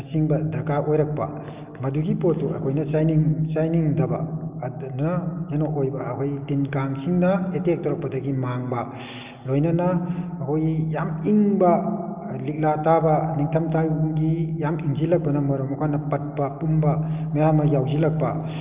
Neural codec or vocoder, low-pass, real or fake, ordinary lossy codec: none; 3.6 kHz; real; Opus, 24 kbps